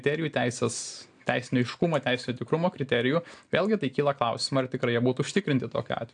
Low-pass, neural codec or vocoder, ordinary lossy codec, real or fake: 9.9 kHz; none; AAC, 64 kbps; real